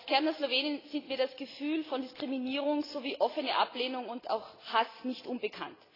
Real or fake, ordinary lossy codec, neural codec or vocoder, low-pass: real; AAC, 24 kbps; none; 5.4 kHz